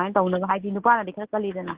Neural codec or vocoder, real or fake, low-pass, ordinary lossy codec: none; real; 3.6 kHz; Opus, 16 kbps